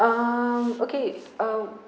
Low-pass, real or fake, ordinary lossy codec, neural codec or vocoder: none; real; none; none